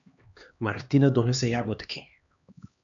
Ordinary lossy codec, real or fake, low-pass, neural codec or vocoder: MP3, 64 kbps; fake; 7.2 kHz; codec, 16 kHz, 2 kbps, X-Codec, HuBERT features, trained on LibriSpeech